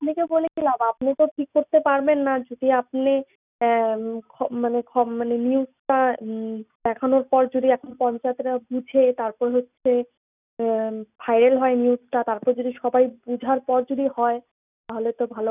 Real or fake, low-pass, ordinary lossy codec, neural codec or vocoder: real; 3.6 kHz; none; none